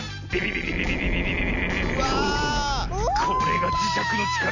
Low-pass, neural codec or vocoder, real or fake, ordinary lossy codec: 7.2 kHz; none; real; none